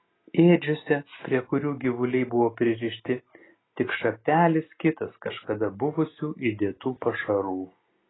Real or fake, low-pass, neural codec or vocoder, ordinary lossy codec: real; 7.2 kHz; none; AAC, 16 kbps